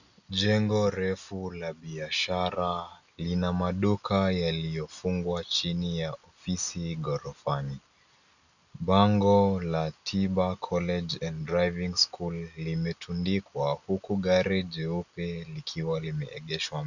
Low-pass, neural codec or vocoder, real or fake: 7.2 kHz; none; real